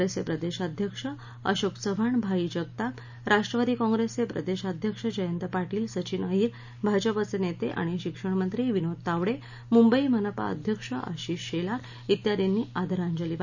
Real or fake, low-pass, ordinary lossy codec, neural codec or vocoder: real; 7.2 kHz; none; none